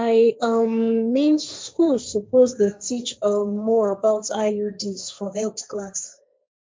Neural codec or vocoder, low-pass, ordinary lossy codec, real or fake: codec, 16 kHz, 1.1 kbps, Voila-Tokenizer; none; none; fake